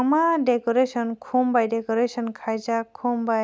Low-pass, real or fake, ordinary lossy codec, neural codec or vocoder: none; real; none; none